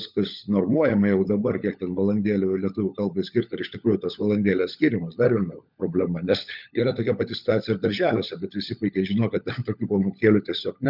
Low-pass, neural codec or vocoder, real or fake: 5.4 kHz; codec, 16 kHz, 16 kbps, FunCodec, trained on LibriTTS, 50 frames a second; fake